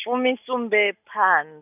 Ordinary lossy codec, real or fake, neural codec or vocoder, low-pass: none; real; none; 3.6 kHz